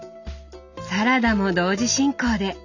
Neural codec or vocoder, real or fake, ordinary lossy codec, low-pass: none; real; none; 7.2 kHz